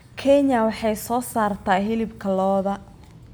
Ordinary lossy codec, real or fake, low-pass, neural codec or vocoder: none; real; none; none